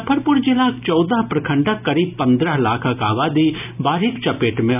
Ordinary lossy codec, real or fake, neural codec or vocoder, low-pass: none; real; none; 3.6 kHz